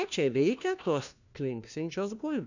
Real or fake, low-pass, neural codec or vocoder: fake; 7.2 kHz; codec, 16 kHz, 1 kbps, FunCodec, trained on LibriTTS, 50 frames a second